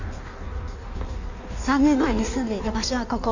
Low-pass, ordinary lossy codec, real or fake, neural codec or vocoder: 7.2 kHz; none; fake; codec, 16 kHz in and 24 kHz out, 1.1 kbps, FireRedTTS-2 codec